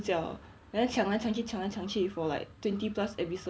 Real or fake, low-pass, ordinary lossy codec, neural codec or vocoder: real; none; none; none